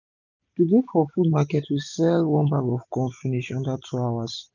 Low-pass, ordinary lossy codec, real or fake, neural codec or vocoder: 7.2 kHz; none; real; none